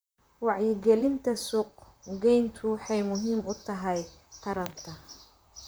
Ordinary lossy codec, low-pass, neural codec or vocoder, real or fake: none; none; vocoder, 44.1 kHz, 128 mel bands, Pupu-Vocoder; fake